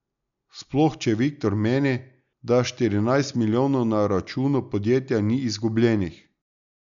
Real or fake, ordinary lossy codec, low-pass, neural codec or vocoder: real; MP3, 96 kbps; 7.2 kHz; none